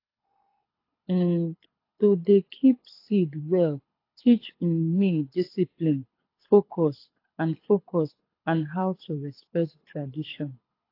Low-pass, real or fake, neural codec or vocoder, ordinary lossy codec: 5.4 kHz; fake; codec, 24 kHz, 6 kbps, HILCodec; AAC, 32 kbps